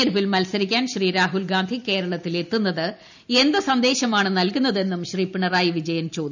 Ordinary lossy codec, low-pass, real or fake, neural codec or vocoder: none; 7.2 kHz; real; none